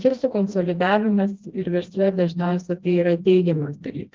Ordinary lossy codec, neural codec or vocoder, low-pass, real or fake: Opus, 32 kbps; codec, 16 kHz, 1 kbps, FreqCodec, smaller model; 7.2 kHz; fake